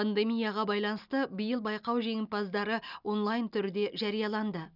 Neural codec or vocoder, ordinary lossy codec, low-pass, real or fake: none; none; 5.4 kHz; real